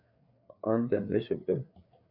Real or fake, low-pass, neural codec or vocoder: fake; 5.4 kHz; codec, 16 kHz, 4 kbps, FreqCodec, larger model